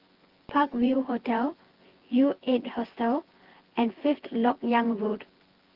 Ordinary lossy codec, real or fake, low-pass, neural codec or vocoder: Opus, 16 kbps; fake; 5.4 kHz; vocoder, 24 kHz, 100 mel bands, Vocos